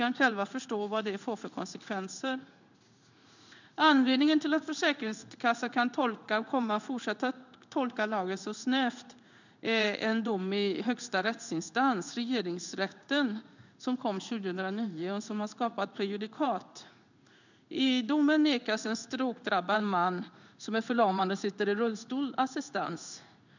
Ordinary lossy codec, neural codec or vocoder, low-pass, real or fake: none; codec, 16 kHz in and 24 kHz out, 1 kbps, XY-Tokenizer; 7.2 kHz; fake